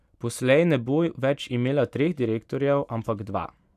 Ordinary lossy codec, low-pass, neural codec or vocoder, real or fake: none; 14.4 kHz; vocoder, 44.1 kHz, 128 mel bands every 512 samples, BigVGAN v2; fake